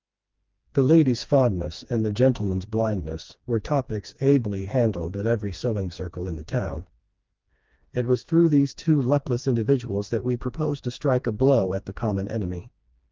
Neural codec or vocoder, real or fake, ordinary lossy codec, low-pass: codec, 16 kHz, 2 kbps, FreqCodec, smaller model; fake; Opus, 32 kbps; 7.2 kHz